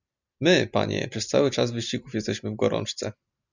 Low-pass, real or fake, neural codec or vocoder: 7.2 kHz; real; none